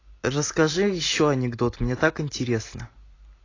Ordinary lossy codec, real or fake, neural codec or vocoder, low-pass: AAC, 32 kbps; real; none; 7.2 kHz